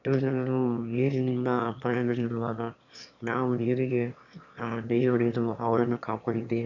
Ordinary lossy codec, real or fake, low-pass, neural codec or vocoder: none; fake; 7.2 kHz; autoencoder, 22.05 kHz, a latent of 192 numbers a frame, VITS, trained on one speaker